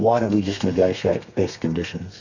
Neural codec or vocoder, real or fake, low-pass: codec, 32 kHz, 1.9 kbps, SNAC; fake; 7.2 kHz